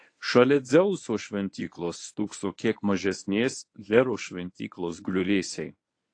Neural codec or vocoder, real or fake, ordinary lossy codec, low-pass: codec, 24 kHz, 0.9 kbps, WavTokenizer, medium speech release version 1; fake; AAC, 48 kbps; 9.9 kHz